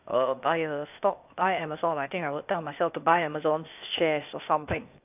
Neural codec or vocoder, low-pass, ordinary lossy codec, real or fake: codec, 16 kHz, 0.8 kbps, ZipCodec; 3.6 kHz; none; fake